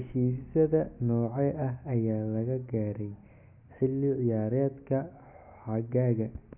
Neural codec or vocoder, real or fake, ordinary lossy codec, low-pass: none; real; none; 3.6 kHz